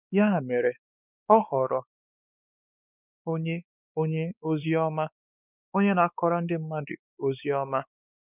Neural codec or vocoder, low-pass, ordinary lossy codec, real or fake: codec, 16 kHz, 4 kbps, X-Codec, WavLM features, trained on Multilingual LibriSpeech; 3.6 kHz; none; fake